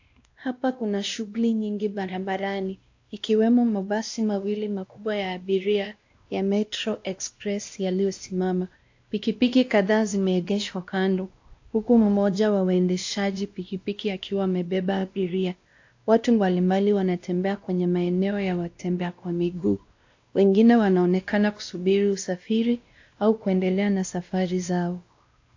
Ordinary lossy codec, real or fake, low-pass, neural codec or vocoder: AAC, 48 kbps; fake; 7.2 kHz; codec, 16 kHz, 1 kbps, X-Codec, WavLM features, trained on Multilingual LibriSpeech